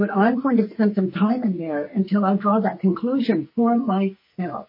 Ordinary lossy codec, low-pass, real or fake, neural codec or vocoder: MP3, 24 kbps; 5.4 kHz; fake; codec, 44.1 kHz, 2.6 kbps, SNAC